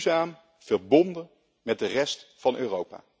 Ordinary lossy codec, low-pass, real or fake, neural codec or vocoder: none; none; real; none